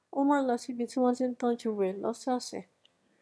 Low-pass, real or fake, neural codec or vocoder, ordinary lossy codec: none; fake; autoencoder, 22.05 kHz, a latent of 192 numbers a frame, VITS, trained on one speaker; none